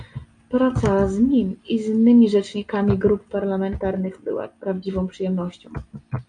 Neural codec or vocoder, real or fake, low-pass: none; real; 9.9 kHz